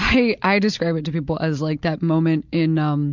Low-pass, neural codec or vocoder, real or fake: 7.2 kHz; none; real